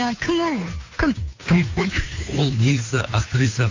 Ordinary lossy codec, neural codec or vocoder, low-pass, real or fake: AAC, 48 kbps; codec, 16 kHz, 2 kbps, FunCodec, trained on Chinese and English, 25 frames a second; 7.2 kHz; fake